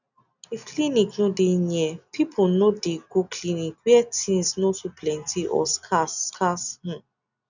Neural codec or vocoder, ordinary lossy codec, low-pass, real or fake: none; none; 7.2 kHz; real